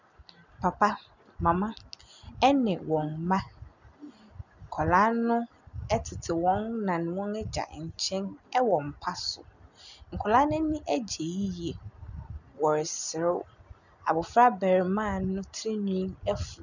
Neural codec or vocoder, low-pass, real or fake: none; 7.2 kHz; real